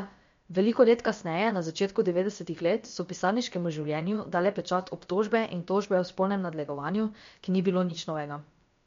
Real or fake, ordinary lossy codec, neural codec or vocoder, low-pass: fake; MP3, 48 kbps; codec, 16 kHz, about 1 kbps, DyCAST, with the encoder's durations; 7.2 kHz